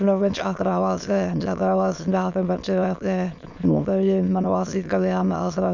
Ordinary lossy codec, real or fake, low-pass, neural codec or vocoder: none; fake; 7.2 kHz; autoencoder, 22.05 kHz, a latent of 192 numbers a frame, VITS, trained on many speakers